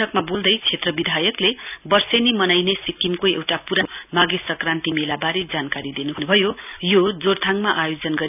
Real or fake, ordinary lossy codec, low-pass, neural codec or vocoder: real; none; 3.6 kHz; none